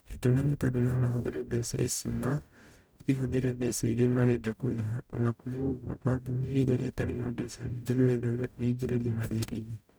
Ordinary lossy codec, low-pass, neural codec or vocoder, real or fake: none; none; codec, 44.1 kHz, 0.9 kbps, DAC; fake